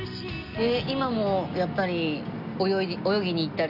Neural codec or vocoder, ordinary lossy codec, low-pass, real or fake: none; none; 5.4 kHz; real